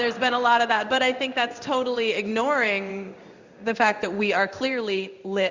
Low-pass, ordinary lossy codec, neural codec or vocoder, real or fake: 7.2 kHz; Opus, 64 kbps; codec, 16 kHz in and 24 kHz out, 1 kbps, XY-Tokenizer; fake